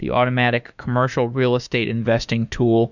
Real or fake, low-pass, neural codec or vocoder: fake; 7.2 kHz; autoencoder, 48 kHz, 32 numbers a frame, DAC-VAE, trained on Japanese speech